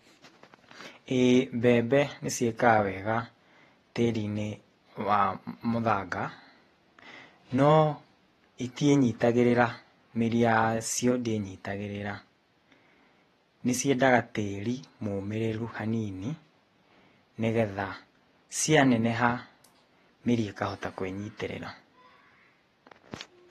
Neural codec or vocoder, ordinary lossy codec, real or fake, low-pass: vocoder, 48 kHz, 128 mel bands, Vocos; AAC, 32 kbps; fake; 19.8 kHz